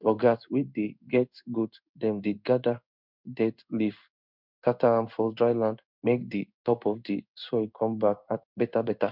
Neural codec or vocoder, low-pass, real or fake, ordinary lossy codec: codec, 16 kHz in and 24 kHz out, 1 kbps, XY-Tokenizer; 5.4 kHz; fake; none